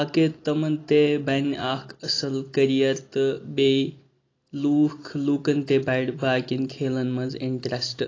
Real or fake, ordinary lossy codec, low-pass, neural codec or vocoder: real; AAC, 32 kbps; 7.2 kHz; none